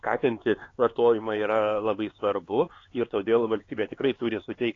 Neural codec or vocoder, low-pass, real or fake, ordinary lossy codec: codec, 16 kHz, 4 kbps, X-Codec, HuBERT features, trained on LibriSpeech; 7.2 kHz; fake; AAC, 32 kbps